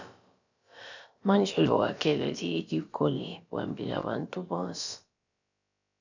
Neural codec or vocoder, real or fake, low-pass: codec, 16 kHz, about 1 kbps, DyCAST, with the encoder's durations; fake; 7.2 kHz